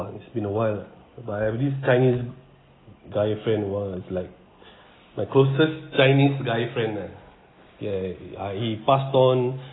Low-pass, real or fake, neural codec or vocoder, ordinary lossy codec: 7.2 kHz; real; none; AAC, 16 kbps